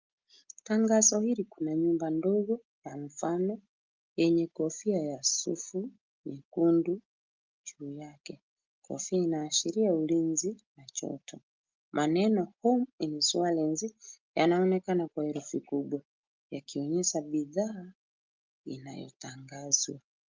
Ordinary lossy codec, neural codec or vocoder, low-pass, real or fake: Opus, 32 kbps; none; 7.2 kHz; real